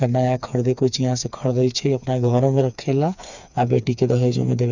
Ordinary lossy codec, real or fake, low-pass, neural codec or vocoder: none; fake; 7.2 kHz; codec, 16 kHz, 4 kbps, FreqCodec, smaller model